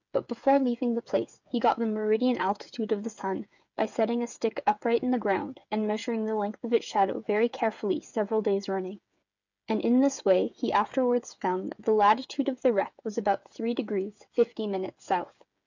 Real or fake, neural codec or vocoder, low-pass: fake; codec, 16 kHz, 8 kbps, FreqCodec, smaller model; 7.2 kHz